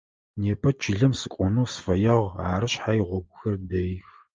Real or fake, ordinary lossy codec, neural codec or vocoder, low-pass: real; Opus, 24 kbps; none; 7.2 kHz